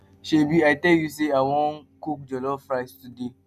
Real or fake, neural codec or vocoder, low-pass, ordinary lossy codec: real; none; 14.4 kHz; none